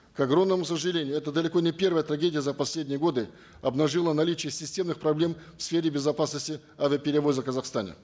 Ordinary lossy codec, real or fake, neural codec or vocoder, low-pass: none; real; none; none